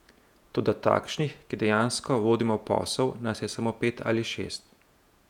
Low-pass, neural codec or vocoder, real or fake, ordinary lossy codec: 19.8 kHz; vocoder, 48 kHz, 128 mel bands, Vocos; fake; none